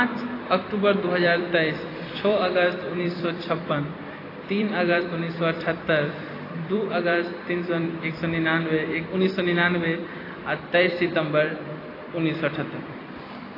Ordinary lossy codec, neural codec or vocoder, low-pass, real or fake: AAC, 24 kbps; vocoder, 44.1 kHz, 128 mel bands every 256 samples, BigVGAN v2; 5.4 kHz; fake